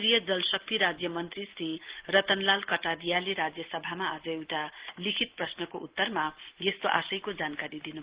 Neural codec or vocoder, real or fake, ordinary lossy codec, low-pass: none; real; Opus, 16 kbps; 3.6 kHz